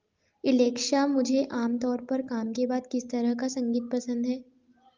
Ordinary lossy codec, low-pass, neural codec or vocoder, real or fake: Opus, 32 kbps; 7.2 kHz; none; real